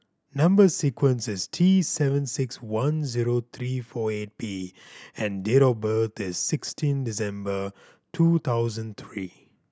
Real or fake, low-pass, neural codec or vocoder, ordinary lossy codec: real; none; none; none